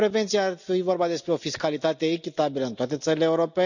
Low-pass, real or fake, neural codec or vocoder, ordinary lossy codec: 7.2 kHz; real; none; none